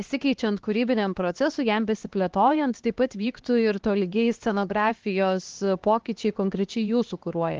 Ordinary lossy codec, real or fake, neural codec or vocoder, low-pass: Opus, 16 kbps; fake; codec, 16 kHz, 2 kbps, X-Codec, HuBERT features, trained on LibriSpeech; 7.2 kHz